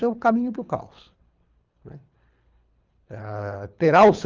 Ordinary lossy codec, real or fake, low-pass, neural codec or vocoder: Opus, 24 kbps; fake; 7.2 kHz; codec, 24 kHz, 3 kbps, HILCodec